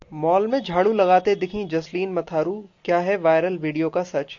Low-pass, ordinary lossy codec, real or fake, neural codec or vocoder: 7.2 kHz; AAC, 48 kbps; real; none